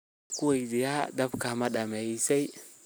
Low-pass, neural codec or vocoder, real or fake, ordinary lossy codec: none; none; real; none